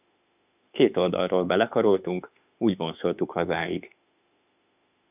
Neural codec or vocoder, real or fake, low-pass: autoencoder, 48 kHz, 32 numbers a frame, DAC-VAE, trained on Japanese speech; fake; 3.6 kHz